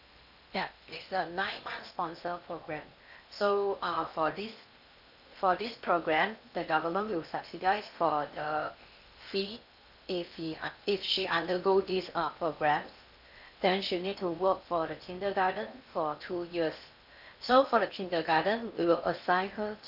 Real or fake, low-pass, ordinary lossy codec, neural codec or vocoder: fake; 5.4 kHz; none; codec, 16 kHz in and 24 kHz out, 0.8 kbps, FocalCodec, streaming, 65536 codes